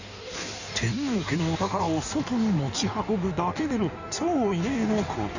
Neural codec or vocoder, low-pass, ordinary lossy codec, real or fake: codec, 16 kHz in and 24 kHz out, 1.1 kbps, FireRedTTS-2 codec; 7.2 kHz; none; fake